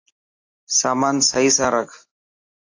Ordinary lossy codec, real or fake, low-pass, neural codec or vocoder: AAC, 48 kbps; real; 7.2 kHz; none